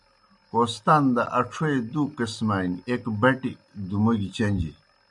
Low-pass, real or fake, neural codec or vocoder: 10.8 kHz; real; none